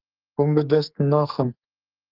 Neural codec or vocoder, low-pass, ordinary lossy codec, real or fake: codec, 44.1 kHz, 2.6 kbps, SNAC; 5.4 kHz; Opus, 24 kbps; fake